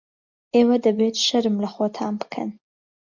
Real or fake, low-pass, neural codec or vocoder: real; 7.2 kHz; none